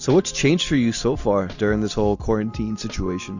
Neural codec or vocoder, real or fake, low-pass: none; real; 7.2 kHz